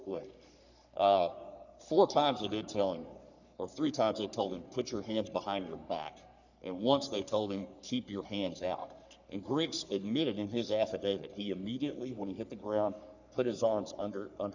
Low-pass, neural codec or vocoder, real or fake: 7.2 kHz; codec, 44.1 kHz, 3.4 kbps, Pupu-Codec; fake